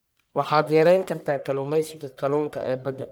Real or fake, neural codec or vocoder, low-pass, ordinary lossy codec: fake; codec, 44.1 kHz, 1.7 kbps, Pupu-Codec; none; none